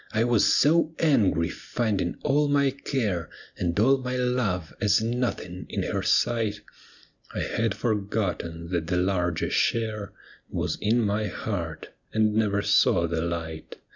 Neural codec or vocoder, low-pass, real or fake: none; 7.2 kHz; real